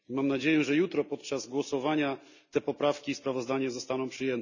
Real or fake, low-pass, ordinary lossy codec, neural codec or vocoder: real; 7.2 kHz; none; none